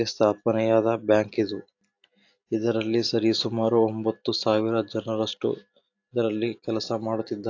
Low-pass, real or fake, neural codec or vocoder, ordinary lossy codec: 7.2 kHz; real; none; AAC, 48 kbps